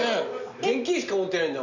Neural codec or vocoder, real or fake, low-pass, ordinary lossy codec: none; real; 7.2 kHz; none